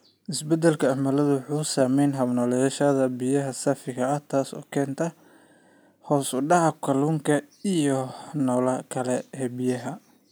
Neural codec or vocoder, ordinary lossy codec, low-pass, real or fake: none; none; none; real